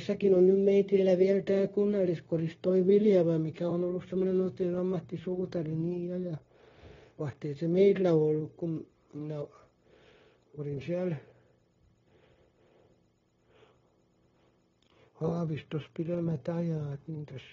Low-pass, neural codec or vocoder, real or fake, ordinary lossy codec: 7.2 kHz; codec, 16 kHz, 0.9 kbps, LongCat-Audio-Codec; fake; AAC, 24 kbps